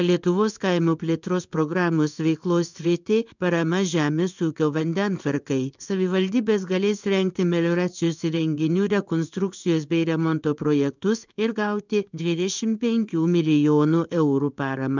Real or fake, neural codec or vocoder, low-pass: fake; codec, 16 kHz in and 24 kHz out, 1 kbps, XY-Tokenizer; 7.2 kHz